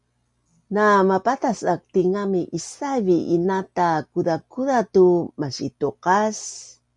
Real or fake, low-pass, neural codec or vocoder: real; 10.8 kHz; none